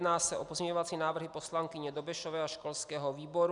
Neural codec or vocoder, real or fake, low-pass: none; real; 10.8 kHz